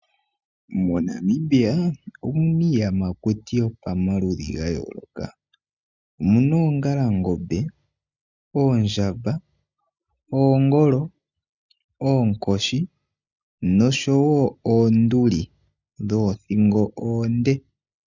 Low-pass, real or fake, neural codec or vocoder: 7.2 kHz; real; none